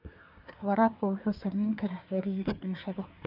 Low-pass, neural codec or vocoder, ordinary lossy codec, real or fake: 5.4 kHz; codec, 24 kHz, 1 kbps, SNAC; none; fake